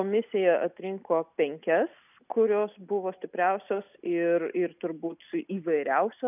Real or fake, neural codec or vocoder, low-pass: real; none; 3.6 kHz